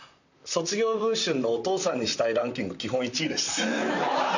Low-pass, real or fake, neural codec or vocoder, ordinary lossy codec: 7.2 kHz; fake; vocoder, 44.1 kHz, 128 mel bands, Pupu-Vocoder; none